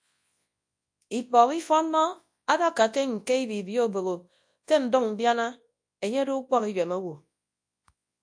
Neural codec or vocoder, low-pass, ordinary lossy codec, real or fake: codec, 24 kHz, 0.9 kbps, WavTokenizer, large speech release; 9.9 kHz; AAC, 64 kbps; fake